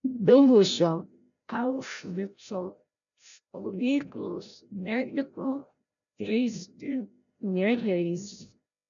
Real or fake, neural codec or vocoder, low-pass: fake; codec, 16 kHz, 0.5 kbps, FreqCodec, larger model; 7.2 kHz